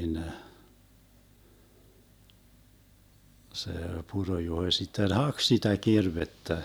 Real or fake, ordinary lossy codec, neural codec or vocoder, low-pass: real; none; none; none